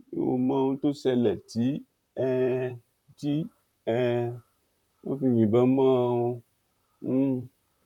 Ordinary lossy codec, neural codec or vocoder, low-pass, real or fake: none; vocoder, 44.1 kHz, 128 mel bands, Pupu-Vocoder; 19.8 kHz; fake